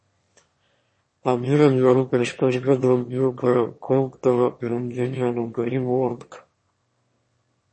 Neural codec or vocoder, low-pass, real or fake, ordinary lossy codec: autoencoder, 22.05 kHz, a latent of 192 numbers a frame, VITS, trained on one speaker; 9.9 kHz; fake; MP3, 32 kbps